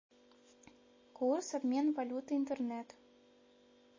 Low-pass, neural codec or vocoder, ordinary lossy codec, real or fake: 7.2 kHz; none; MP3, 32 kbps; real